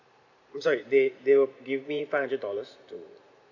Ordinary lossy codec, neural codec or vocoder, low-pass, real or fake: none; vocoder, 44.1 kHz, 128 mel bands every 512 samples, BigVGAN v2; 7.2 kHz; fake